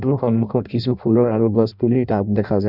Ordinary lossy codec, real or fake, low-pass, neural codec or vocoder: none; fake; 5.4 kHz; codec, 16 kHz in and 24 kHz out, 0.6 kbps, FireRedTTS-2 codec